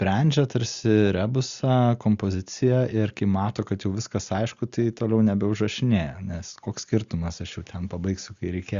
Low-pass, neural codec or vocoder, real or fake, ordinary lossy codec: 7.2 kHz; none; real; Opus, 64 kbps